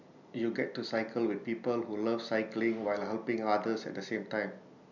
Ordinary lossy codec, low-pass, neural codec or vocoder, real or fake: none; 7.2 kHz; none; real